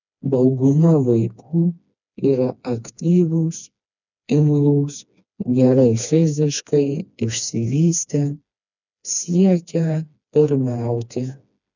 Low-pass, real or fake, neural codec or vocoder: 7.2 kHz; fake; codec, 16 kHz, 2 kbps, FreqCodec, smaller model